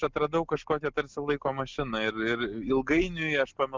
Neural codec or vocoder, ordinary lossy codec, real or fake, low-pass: none; Opus, 32 kbps; real; 7.2 kHz